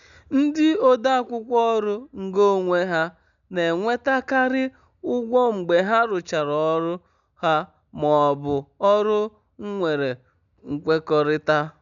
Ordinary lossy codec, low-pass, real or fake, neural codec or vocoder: MP3, 96 kbps; 7.2 kHz; real; none